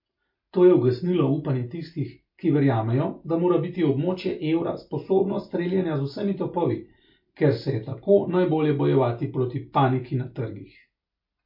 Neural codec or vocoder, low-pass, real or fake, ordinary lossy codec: none; 5.4 kHz; real; MP3, 32 kbps